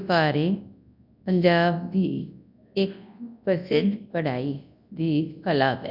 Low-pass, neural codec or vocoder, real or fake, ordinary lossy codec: 5.4 kHz; codec, 24 kHz, 0.9 kbps, WavTokenizer, large speech release; fake; none